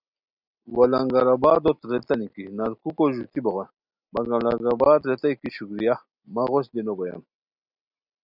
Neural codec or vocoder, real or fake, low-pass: none; real; 5.4 kHz